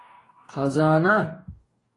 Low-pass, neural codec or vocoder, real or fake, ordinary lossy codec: 10.8 kHz; codec, 44.1 kHz, 2.6 kbps, DAC; fake; AAC, 32 kbps